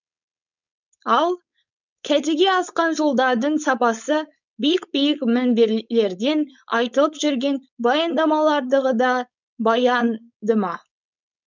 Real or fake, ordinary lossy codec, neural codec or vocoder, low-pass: fake; none; codec, 16 kHz, 4.8 kbps, FACodec; 7.2 kHz